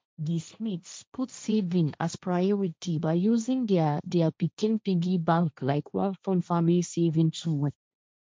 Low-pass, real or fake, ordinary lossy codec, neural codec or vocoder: none; fake; none; codec, 16 kHz, 1.1 kbps, Voila-Tokenizer